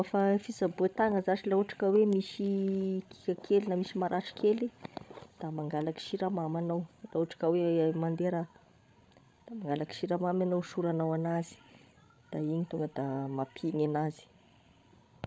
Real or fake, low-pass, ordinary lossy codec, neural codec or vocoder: fake; none; none; codec, 16 kHz, 16 kbps, FreqCodec, larger model